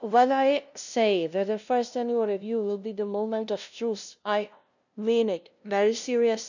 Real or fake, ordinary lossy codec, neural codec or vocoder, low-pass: fake; none; codec, 16 kHz, 0.5 kbps, FunCodec, trained on LibriTTS, 25 frames a second; 7.2 kHz